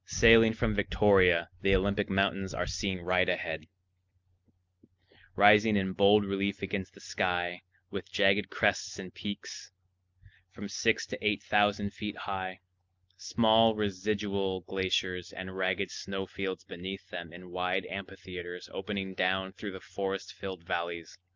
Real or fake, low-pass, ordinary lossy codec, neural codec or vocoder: real; 7.2 kHz; Opus, 24 kbps; none